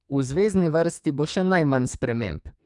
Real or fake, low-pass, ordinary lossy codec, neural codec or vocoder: fake; 10.8 kHz; none; codec, 44.1 kHz, 2.6 kbps, SNAC